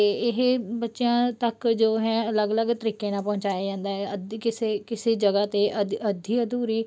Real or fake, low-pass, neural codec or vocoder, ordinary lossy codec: real; none; none; none